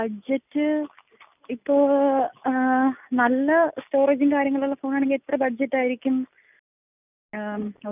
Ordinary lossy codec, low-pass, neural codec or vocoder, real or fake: none; 3.6 kHz; none; real